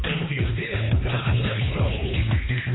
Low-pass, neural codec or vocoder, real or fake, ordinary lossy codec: 7.2 kHz; codec, 24 kHz, 3 kbps, HILCodec; fake; AAC, 16 kbps